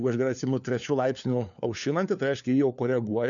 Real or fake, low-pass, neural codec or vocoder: fake; 7.2 kHz; codec, 16 kHz, 4 kbps, FunCodec, trained on LibriTTS, 50 frames a second